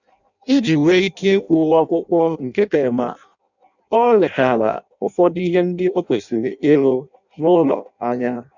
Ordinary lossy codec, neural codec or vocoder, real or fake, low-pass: none; codec, 16 kHz in and 24 kHz out, 0.6 kbps, FireRedTTS-2 codec; fake; 7.2 kHz